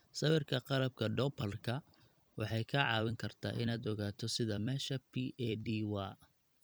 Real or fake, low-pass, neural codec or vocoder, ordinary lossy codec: fake; none; vocoder, 44.1 kHz, 128 mel bands every 256 samples, BigVGAN v2; none